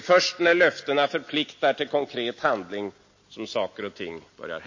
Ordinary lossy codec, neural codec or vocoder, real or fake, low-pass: MP3, 32 kbps; none; real; 7.2 kHz